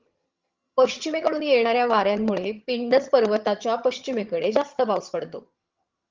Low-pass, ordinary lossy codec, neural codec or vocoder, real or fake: 7.2 kHz; Opus, 32 kbps; vocoder, 22.05 kHz, 80 mel bands, HiFi-GAN; fake